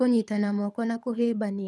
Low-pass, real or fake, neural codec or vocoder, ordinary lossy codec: none; fake; codec, 24 kHz, 6 kbps, HILCodec; none